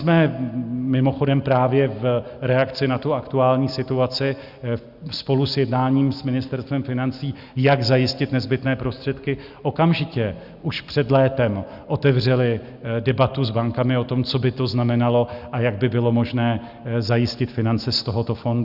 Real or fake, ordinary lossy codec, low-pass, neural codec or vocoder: real; Opus, 64 kbps; 5.4 kHz; none